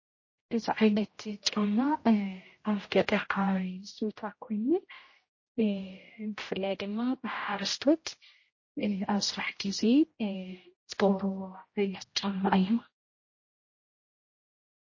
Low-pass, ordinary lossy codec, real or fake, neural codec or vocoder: 7.2 kHz; MP3, 32 kbps; fake; codec, 16 kHz, 0.5 kbps, X-Codec, HuBERT features, trained on general audio